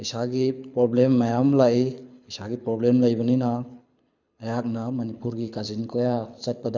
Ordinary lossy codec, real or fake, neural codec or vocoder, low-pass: none; fake; codec, 24 kHz, 6 kbps, HILCodec; 7.2 kHz